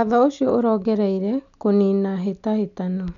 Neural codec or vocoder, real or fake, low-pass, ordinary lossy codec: none; real; 7.2 kHz; none